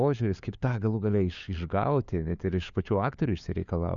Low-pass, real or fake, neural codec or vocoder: 7.2 kHz; fake; codec, 16 kHz, 4 kbps, FunCodec, trained on LibriTTS, 50 frames a second